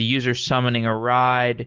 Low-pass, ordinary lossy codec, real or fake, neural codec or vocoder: 7.2 kHz; Opus, 16 kbps; real; none